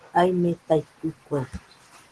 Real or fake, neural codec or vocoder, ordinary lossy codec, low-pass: real; none; Opus, 16 kbps; 10.8 kHz